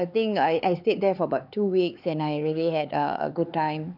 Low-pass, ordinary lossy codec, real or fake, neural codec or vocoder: 5.4 kHz; none; fake; codec, 16 kHz, 4 kbps, X-Codec, HuBERT features, trained on LibriSpeech